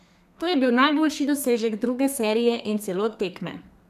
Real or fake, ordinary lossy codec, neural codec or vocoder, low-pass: fake; none; codec, 32 kHz, 1.9 kbps, SNAC; 14.4 kHz